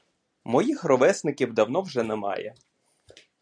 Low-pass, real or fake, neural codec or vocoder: 9.9 kHz; real; none